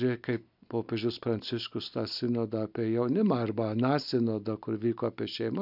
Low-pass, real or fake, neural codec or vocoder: 5.4 kHz; real; none